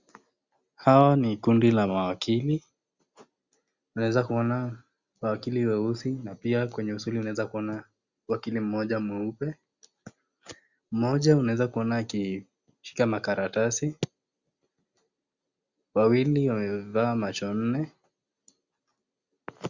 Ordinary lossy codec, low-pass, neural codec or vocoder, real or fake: Opus, 64 kbps; 7.2 kHz; none; real